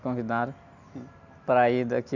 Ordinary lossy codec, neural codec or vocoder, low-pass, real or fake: none; none; 7.2 kHz; real